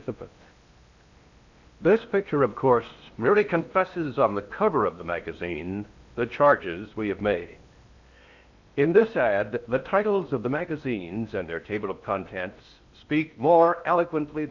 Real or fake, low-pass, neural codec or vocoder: fake; 7.2 kHz; codec, 16 kHz in and 24 kHz out, 0.8 kbps, FocalCodec, streaming, 65536 codes